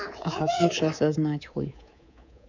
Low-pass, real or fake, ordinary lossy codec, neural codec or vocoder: 7.2 kHz; fake; none; codec, 24 kHz, 3.1 kbps, DualCodec